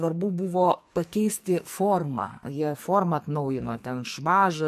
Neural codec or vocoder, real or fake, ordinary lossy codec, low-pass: codec, 44.1 kHz, 2.6 kbps, SNAC; fake; MP3, 64 kbps; 14.4 kHz